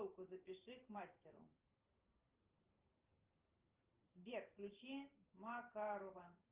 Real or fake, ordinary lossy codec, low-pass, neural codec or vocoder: fake; Opus, 32 kbps; 3.6 kHz; vocoder, 44.1 kHz, 128 mel bands every 512 samples, BigVGAN v2